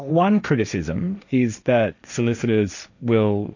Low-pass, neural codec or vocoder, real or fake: 7.2 kHz; codec, 16 kHz, 1.1 kbps, Voila-Tokenizer; fake